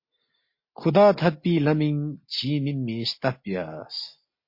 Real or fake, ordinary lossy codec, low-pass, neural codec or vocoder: real; MP3, 32 kbps; 5.4 kHz; none